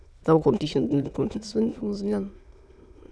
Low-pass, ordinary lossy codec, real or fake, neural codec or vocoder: none; none; fake; autoencoder, 22.05 kHz, a latent of 192 numbers a frame, VITS, trained on many speakers